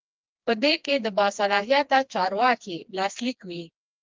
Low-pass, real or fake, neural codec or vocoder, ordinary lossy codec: 7.2 kHz; fake; codec, 16 kHz, 2 kbps, FreqCodec, smaller model; Opus, 24 kbps